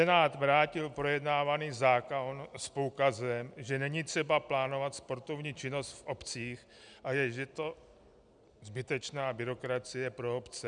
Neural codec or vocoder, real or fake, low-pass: none; real; 9.9 kHz